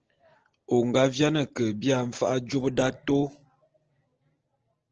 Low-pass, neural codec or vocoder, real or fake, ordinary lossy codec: 7.2 kHz; none; real; Opus, 16 kbps